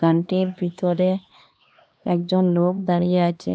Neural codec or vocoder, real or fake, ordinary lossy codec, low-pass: codec, 16 kHz, 2 kbps, X-Codec, HuBERT features, trained on LibriSpeech; fake; none; none